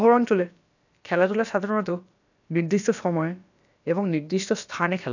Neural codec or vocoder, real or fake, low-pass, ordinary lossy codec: codec, 16 kHz, about 1 kbps, DyCAST, with the encoder's durations; fake; 7.2 kHz; none